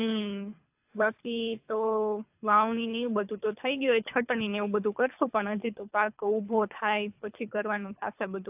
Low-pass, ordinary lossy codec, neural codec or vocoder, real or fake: 3.6 kHz; AAC, 32 kbps; codec, 24 kHz, 3 kbps, HILCodec; fake